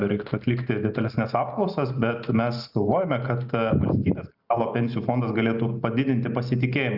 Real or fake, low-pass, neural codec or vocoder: real; 5.4 kHz; none